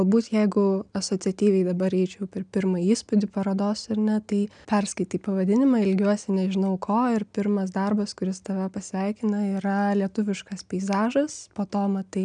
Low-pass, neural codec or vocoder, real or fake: 9.9 kHz; none; real